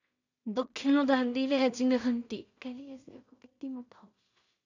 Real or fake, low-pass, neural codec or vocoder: fake; 7.2 kHz; codec, 16 kHz in and 24 kHz out, 0.4 kbps, LongCat-Audio-Codec, two codebook decoder